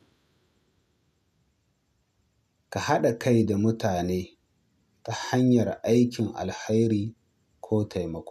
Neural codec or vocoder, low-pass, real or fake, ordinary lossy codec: none; 14.4 kHz; real; none